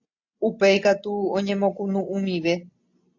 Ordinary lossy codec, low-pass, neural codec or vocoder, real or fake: AAC, 48 kbps; 7.2 kHz; none; real